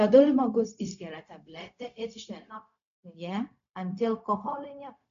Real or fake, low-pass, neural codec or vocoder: fake; 7.2 kHz; codec, 16 kHz, 0.4 kbps, LongCat-Audio-Codec